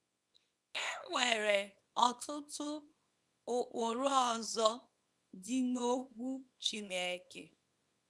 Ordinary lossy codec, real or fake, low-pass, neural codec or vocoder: none; fake; none; codec, 24 kHz, 0.9 kbps, WavTokenizer, small release